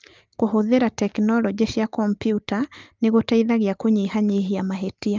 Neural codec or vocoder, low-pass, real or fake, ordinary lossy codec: none; 7.2 kHz; real; Opus, 32 kbps